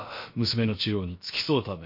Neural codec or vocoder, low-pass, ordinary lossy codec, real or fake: codec, 16 kHz, about 1 kbps, DyCAST, with the encoder's durations; 5.4 kHz; MP3, 32 kbps; fake